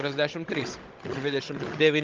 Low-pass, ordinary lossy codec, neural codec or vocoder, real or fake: 7.2 kHz; Opus, 32 kbps; codec, 16 kHz, 16 kbps, FunCodec, trained on Chinese and English, 50 frames a second; fake